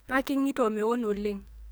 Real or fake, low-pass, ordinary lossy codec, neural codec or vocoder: fake; none; none; codec, 44.1 kHz, 2.6 kbps, SNAC